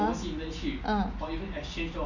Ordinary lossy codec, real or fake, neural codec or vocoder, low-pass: none; real; none; 7.2 kHz